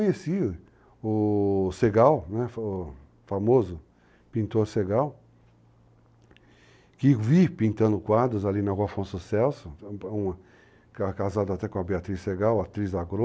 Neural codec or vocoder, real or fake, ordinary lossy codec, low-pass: none; real; none; none